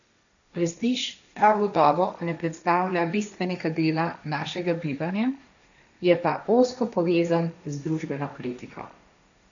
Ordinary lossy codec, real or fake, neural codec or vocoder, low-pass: none; fake; codec, 16 kHz, 1.1 kbps, Voila-Tokenizer; 7.2 kHz